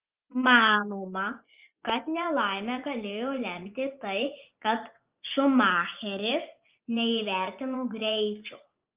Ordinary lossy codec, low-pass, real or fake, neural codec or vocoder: Opus, 24 kbps; 3.6 kHz; fake; vocoder, 24 kHz, 100 mel bands, Vocos